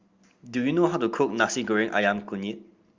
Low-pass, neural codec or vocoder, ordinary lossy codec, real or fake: 7.2 kHz; none; Opus, 32 kbps; real